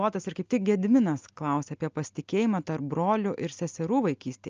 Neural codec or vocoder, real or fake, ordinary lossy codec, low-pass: none; real; Opus, 24 kbps; 7.2 kHz